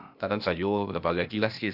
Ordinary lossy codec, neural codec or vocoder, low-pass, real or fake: none; codec, 16 kHz, 0.8 kbps, ZipCodec; 5.4 kHz; fake